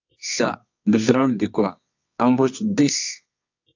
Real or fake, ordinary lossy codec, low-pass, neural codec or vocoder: fake; AAC, 48 kbps; 7.2 kHz; codec, 24 kHz, 0.9 kbps, WavTokenizer, medium music audio release